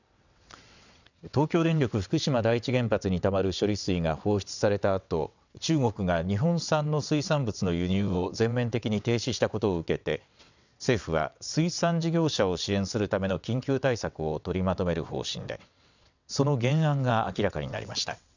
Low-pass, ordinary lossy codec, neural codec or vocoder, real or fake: 7.2 kHz; none; vocoder, 22.05 kHz, 80 mel bands, WaveNeXt; fake